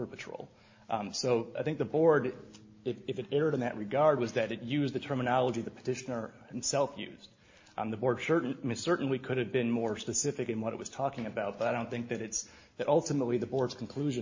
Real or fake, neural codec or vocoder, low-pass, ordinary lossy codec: real; none; 7.2 kHz; MP3, 32 kbps